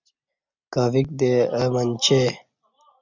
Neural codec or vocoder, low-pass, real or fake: none; 7.2 kHz; real